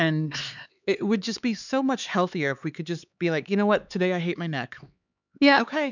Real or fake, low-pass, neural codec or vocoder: fake; 7.2 kHz; codec, 16 kHz, 4 kbps, X-Codec, HuBERT features, trained on LibriSpeech